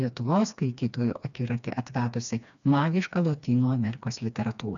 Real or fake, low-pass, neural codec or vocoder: fake; 7.2 kHz; codec, 16 kHz, 2 kbps, FreqCodec, smaller model